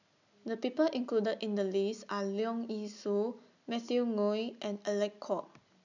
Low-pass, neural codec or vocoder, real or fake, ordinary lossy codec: 7.2 kHz; none; real; none